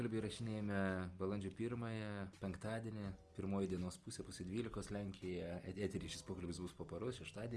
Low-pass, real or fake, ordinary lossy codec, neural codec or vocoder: 10.8 kHz; real; Opus, 24 kbps; none